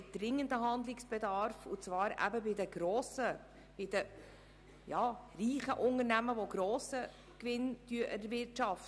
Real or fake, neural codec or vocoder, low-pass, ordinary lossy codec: real; none; none; none